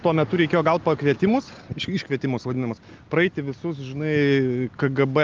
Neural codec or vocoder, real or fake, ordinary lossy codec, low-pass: none; real; Opus, 32 kbps; 7.2 kHz